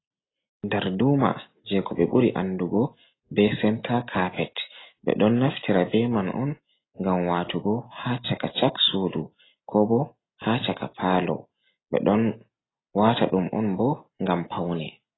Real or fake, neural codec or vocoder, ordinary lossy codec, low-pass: real; none; AAC, 16 kbps; 7.2 kHz